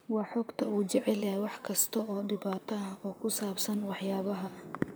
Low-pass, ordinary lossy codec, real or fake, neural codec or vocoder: none; none; fake; vocoder, 44.1 kHz, 128 mel bands, Pupu-Vocoder